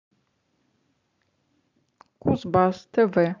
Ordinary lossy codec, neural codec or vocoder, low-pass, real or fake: MP3, 64 kbps; none; 7.2 kHz; real